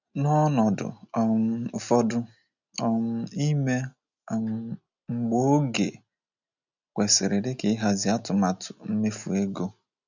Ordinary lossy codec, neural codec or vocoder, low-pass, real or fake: none; none; 7.2 kHz; real